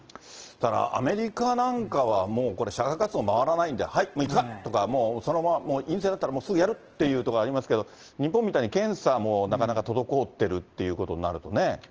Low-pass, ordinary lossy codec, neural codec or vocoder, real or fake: 7.2 kHz; Opus, 16 kbps; none; real